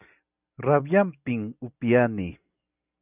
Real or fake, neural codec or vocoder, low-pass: real; none; 3.6 kHz